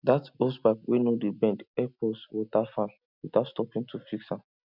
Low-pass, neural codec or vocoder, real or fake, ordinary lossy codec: 5.4 kHz; none; real; none